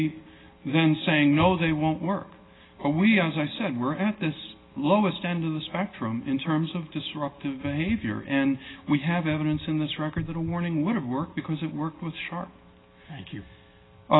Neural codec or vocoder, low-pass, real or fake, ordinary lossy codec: none; 7.2 kHz; real; AAC, 16 kbps